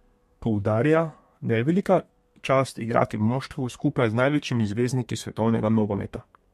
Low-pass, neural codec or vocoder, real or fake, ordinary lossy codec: 14.4 kHz; codec, 32 kHz, 1.9 kbps, SNAC; fake; MP3, 64 kbps